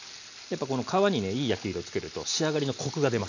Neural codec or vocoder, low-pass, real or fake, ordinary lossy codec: none; 7.2 kHz; real; none